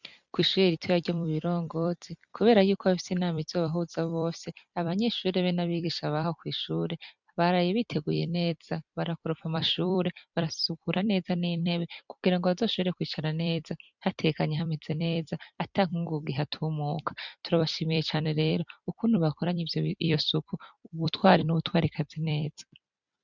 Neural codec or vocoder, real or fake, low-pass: vocoder, 44.1 kHz, 128 mel bands every 256 samples, BigVGAN v2; fake; 7.2 kHz